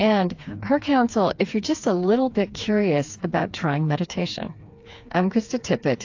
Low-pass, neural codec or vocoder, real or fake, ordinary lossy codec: 7.2 kHz; codec, 16 kHz, 4 kbps, FreqCodec, smaller model; fake; AAC, 48 kbps